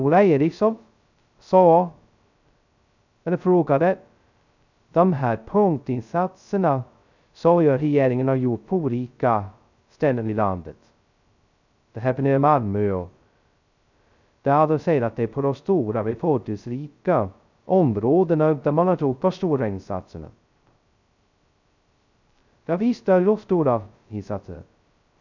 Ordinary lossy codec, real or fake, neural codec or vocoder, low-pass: none; fake; codec, 16 kHz, 0.2 kbps, FocalCodec; 7.2 kHz